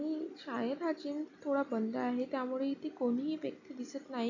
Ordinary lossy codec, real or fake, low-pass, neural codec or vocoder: none; real; 7.2 kHz; none